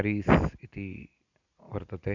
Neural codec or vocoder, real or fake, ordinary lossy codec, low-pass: none; real; none; 7.2 kHz